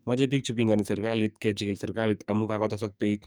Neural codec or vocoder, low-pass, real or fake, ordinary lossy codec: codec, 44.1 kHz, 2.6 kbps, SNAC; none; fake; none